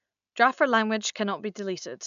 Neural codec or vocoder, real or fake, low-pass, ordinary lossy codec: none; real; 7.2 kHz; none